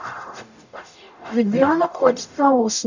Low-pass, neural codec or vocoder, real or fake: 7.2 kHz; codec, 44.1 kHz, 0.9 kbps, DAC; fake